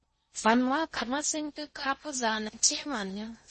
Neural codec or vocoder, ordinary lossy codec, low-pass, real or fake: codec, 16 kHz in and 24 kHz out, 0.6 kbps, FocalCodec, streaming, 4096 codes; MP3, 32 kbps; 10.8 kHz; fake